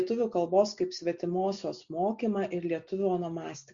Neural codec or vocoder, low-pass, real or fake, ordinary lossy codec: none; 7.2 kHz; real; Opus, 64 kbps